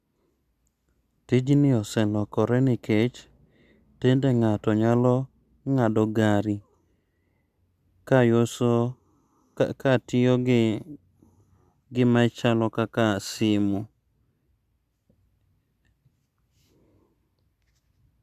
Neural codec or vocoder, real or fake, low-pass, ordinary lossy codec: none; real; 14.4 kHz; Opus, 64 kbps